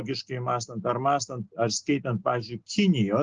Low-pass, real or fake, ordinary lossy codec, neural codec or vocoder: 7.2 kHz; real; Opus, 16 kbps; none